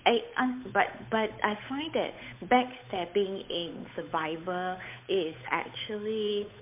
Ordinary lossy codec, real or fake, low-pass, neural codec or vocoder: MP3, 32 kbps; fake; 3.6 kHz; codec, 16 kHz, 8 kbps, FunCodec, trained on Chinese and English, 25 frames a second